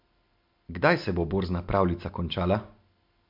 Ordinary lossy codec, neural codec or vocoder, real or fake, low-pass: MP3, 48 kbps; none; real; 5.4 kHz